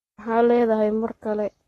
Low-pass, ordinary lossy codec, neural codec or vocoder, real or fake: 19.8 kHz; AAC, 32 kbps; codec, 44.1 kHz, 7.8 kbps, Pupu-Codec; fake